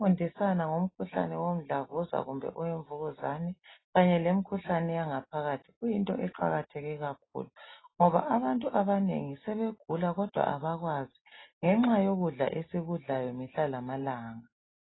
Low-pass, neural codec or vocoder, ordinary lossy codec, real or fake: 7.2 kHz; none; AAC, 16 kbps; real